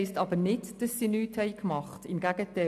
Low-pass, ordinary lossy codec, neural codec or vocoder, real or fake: 14.4 kHz; MP3, 96 kbps; none; real